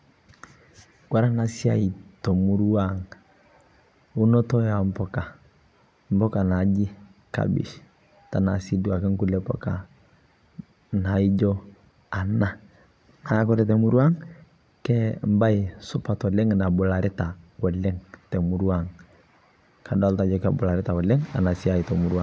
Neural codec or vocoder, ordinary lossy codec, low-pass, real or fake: none; none; none; real